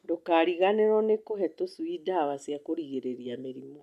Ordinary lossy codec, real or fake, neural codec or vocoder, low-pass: MP3, 96 kbps; real; none; 14.4 kHz